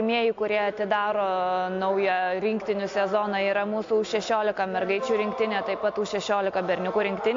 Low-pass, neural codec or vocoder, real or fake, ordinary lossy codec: 7.2 kHz; none; real; AAC, 48 kbps